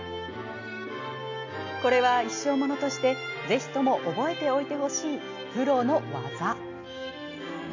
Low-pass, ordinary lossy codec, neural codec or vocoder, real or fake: 7.2 kHz; AAC, 48 kbps; none; real